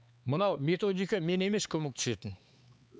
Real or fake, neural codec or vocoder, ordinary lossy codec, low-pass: fake; codec, 16 kHz, 4 kbps, X-Codec, HuBERT features, trained on LibriSpeech; none; none